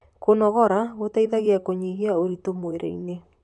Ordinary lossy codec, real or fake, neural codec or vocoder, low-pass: none; fake; vocoder, 44.1 kHz, 128 mel bands, Pupu-Vocoder; 10.8 kHz